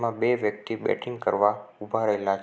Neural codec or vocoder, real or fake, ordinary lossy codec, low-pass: none; real; none; none